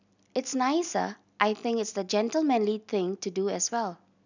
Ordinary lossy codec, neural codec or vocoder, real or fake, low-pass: none; none; real; 7.2 kHz